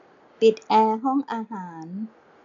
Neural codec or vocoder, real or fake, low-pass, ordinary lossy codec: none; real; 7.2 kHz; AAC, 64 kbps